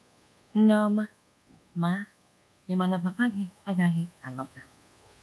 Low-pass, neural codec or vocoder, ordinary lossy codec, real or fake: none; codec, 24 kHz, 1.2 kbps, DualCodec; none; fake